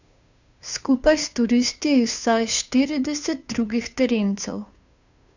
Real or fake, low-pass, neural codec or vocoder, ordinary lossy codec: fake; 7.2 kHz; codec, 16 kHz, 2 kbps, FunCodec, trained on Chinese and English, 25 frames a second; none